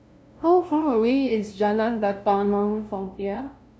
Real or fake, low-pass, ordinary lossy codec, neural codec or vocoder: fake; none; none; codec, 16 kHz, 0.5 kbps, FunCodec, trained on LibriTTS, 25 frames a second